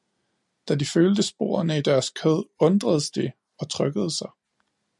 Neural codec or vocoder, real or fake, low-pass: none; real; 10.8 kHz